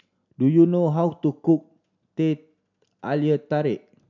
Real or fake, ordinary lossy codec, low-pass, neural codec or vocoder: real; none; 7.2 kHz; none